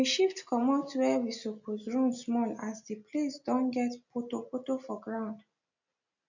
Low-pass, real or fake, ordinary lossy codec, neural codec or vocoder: 7.2 kHz; real; none; none